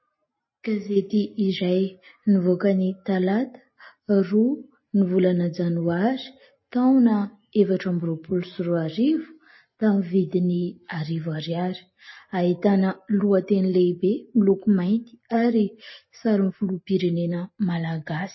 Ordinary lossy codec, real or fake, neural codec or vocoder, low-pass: MP3, 24 kbps; real; none; 7.2 kHz